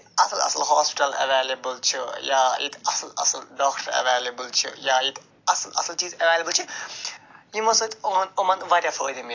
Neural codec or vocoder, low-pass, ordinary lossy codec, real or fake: none; 7.2 kHz; AAC, 48 kbps; real